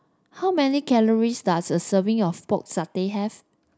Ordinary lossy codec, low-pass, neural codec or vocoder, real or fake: none; none; none; real